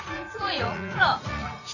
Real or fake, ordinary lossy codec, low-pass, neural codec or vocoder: fake; none; 7.2 kHz; vocoder, 44.1 kHz, 80 mel bands, Vocos